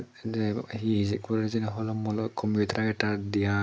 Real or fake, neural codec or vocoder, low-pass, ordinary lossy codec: real; none; none; none